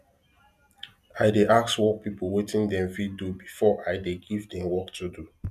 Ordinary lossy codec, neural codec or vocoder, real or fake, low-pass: none; none; real; 14.4 kHz